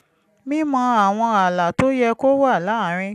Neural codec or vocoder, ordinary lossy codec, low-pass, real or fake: none; none; 14.4 kHz; real